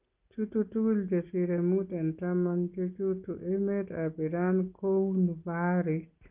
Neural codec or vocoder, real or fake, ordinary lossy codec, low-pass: none; real; Opus, 32 kbps; 3.6 kHz